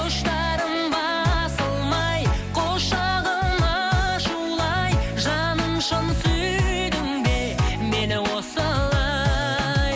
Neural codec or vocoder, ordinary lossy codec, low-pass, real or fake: none; none; none; real